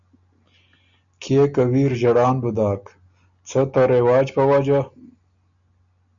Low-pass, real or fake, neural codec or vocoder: 7.2 kHz; real; none